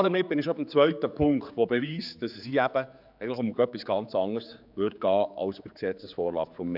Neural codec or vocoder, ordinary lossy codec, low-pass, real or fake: codec, 16 kHz, 4 kbps, X-Codec, HuBERT features, trained on general audio; none; 5.4 kHz; fake